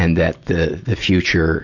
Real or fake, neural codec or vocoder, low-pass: real; none; 7.2 kHz